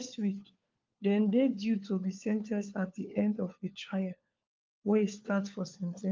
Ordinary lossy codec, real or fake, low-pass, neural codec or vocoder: Opus, 32 kbps; fake; 7.2 kHz; codec, 16 kHz, 4 kbps, FunCodec, trained on LibriTTS, 50 frames a second